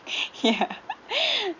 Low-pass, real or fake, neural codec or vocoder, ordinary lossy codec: 7.2 kHz; real; none; none